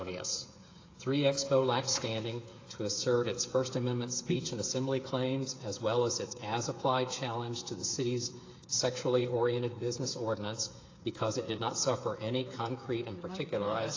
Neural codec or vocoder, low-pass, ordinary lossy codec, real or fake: codec, 16 kHz, 8 kbps, FreqCodec, smaller model; 7.2 kHz; AAC, 32 kbps; fake